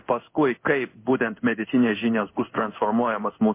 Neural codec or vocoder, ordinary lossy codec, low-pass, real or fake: codec, 16 kHz in and 24 kHz out, 1 kbps, XY-Tokenizer; MP3, 24 kbps; 3.6 kHz; fake